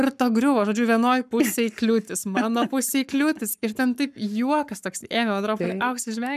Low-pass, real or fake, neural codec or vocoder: 14.4 kHz; fake; codec, 44.1 kHz, 7.8 kbps, Pupu-Codec